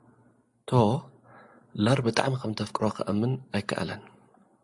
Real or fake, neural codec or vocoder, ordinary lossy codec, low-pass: real; none; AAC, 64 kbps; 10.8 kHz